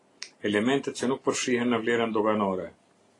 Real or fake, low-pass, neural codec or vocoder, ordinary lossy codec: real; 10.8 kHz; none; AAC, 32 kbps